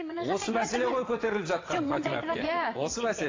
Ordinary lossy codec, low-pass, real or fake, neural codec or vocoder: AAC, 32 kbps; 7.2 kHz; fake; vocoder, 22.05 kHz, 80 mel bands, WaveNeXt